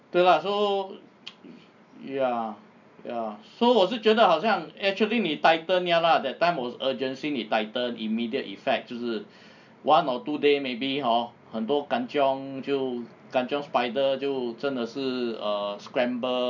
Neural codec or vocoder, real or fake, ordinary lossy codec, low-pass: none; real; none; 7.2 kHz